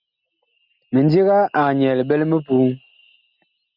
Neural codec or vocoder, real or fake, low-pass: none; real; 5.4 kHz